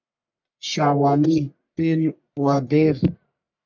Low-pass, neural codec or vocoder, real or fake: 7.2 kHz; codec, 44.1 kHz, 1.7 kbps, Pupu-Codec; fake